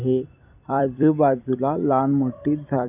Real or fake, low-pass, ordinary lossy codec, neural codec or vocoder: fake; 3.6 kHz; none; vocoder, 44.1 kHz, 128 mel bands every 256 samples, BigVGAN v2